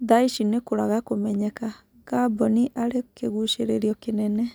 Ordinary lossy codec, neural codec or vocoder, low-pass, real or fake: none; none; none; real